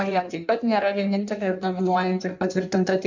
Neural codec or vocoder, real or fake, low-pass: codec, 16 kHz in and 24 kHz out, 1.1 kbps, FireRedTTS-2 codec; fake; 7.2 kHz